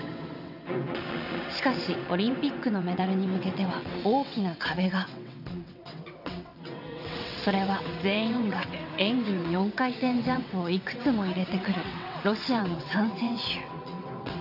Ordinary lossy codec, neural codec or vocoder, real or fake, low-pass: none; vocoder, 22.05 kHz, 80 mel bands, WaveNeXt; fake; 5.4 kHz